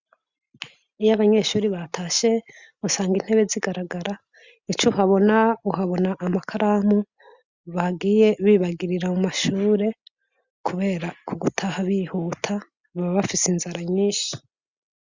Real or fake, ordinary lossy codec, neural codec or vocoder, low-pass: real; Opus, 64 kbps; none; 7.2 kHz